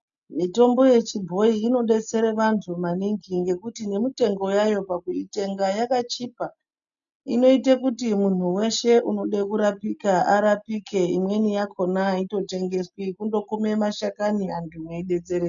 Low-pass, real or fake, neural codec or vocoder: 7.2 kHz; real; none